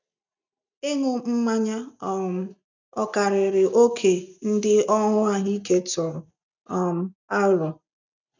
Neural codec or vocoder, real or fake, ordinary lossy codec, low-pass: none; real; none; 7.2 kHz